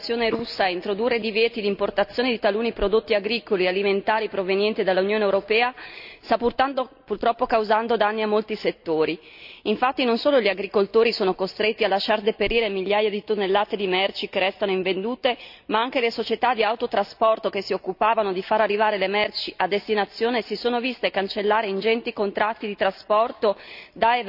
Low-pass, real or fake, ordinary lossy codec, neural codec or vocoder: 5.4 kHz; real; none; none